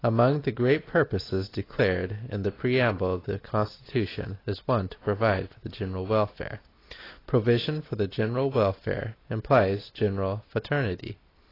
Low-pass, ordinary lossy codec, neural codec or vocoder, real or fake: 5.4 kHz; AAC, 24 kbps; none; real